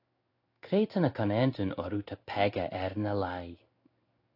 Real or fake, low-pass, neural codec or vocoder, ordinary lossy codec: fake; 5.4 kHz; codec, 16 kHz in and 24 kHz out, 1 kbps, XY-Tokenizer; AAC, 32 kbps